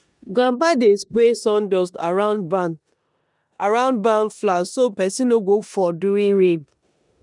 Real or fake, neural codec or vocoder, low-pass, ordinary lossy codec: fake; codec, 16 kHz in and 24 kHz out, 0.9 kbps, LongCat-Audio-Codec, four codebook decoder; 10.8 kHz; none